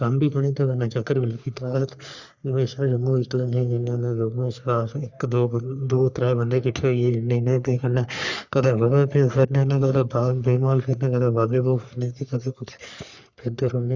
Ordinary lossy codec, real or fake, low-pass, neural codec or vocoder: Opus, 64 kbps; fake; 7.2 kHz; codec, 44.1 kHz, 3.4 kbps, Pupu-Codec